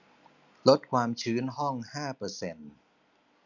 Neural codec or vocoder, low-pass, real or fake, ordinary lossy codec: none; 7.2 kHz; real; none